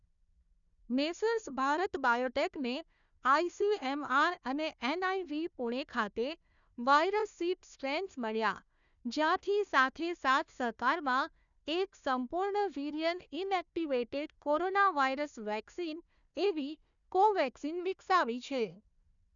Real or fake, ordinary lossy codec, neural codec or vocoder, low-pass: fake; none; codec, 16 kHz, 1 kbps, FunCodec, trained on Chinese and English, 50 frames a second; 7.2 kHz